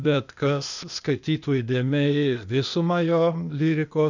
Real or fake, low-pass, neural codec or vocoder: fake; 7.2 kHz; codec, 16 kHz, 0.8 kbps, ZipCodec